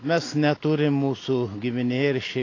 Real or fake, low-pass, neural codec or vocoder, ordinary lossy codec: real; 7.2 kHz; none; AAC, 32 kbps